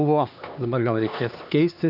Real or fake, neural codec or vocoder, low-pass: fake; codec, 16 kHz, 2 kbps, X-Codec, HuBERT features, trained on LibriSpeech; 5.4 kHz